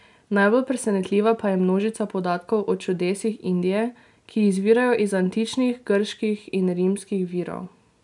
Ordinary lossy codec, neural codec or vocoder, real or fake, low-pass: none; none; real; 10.8 kHz